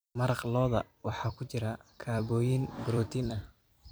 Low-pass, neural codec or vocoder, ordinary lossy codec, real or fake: none; vocoder, 44.1 kHz, 128 mel bands every 256 samples, BigVGAN v2; none; fake